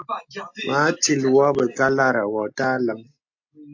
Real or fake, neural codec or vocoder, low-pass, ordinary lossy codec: real; none; 7.2 kHz; AAC, 48 kbps